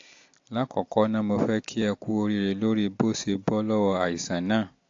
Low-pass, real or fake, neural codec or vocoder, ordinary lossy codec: 7.2 kHz; real; none; AAC, 48 kbps